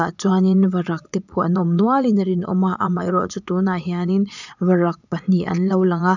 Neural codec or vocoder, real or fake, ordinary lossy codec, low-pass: vocoder, 22.05 kHz, 80 mel bands, Vocos; fake; none; 7.2 kHz